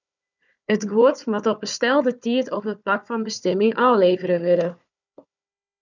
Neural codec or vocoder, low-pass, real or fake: codec, 16 kHz, 4 kbps, FunCodec, trained on Chinese and English, 50 frames a second; 7.2 kHz; fake